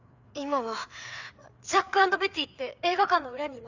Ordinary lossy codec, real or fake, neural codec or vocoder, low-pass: Opus, 64 kbps; fake; codec, 16 kHz, 4 kbps, FreqCodec, larger model; 7.2 kHz